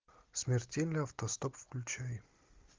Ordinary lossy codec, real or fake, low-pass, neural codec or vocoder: Opus, 24 kbps; real; 7.2 kHz; none